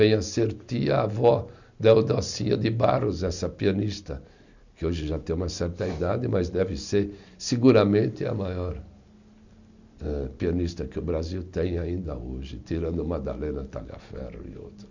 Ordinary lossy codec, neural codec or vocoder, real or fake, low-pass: none; none; real; 7.2 kHz